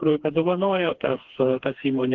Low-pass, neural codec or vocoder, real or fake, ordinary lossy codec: 7.2 kHz; codec, 16 kHz, 2 kbps, FreqCodec, smaller model; fake; Opus, 16 kbps